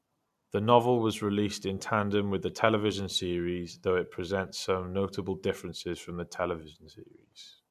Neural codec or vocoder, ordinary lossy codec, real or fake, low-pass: none; MP3, 96 kbps; real; 14.4 kHz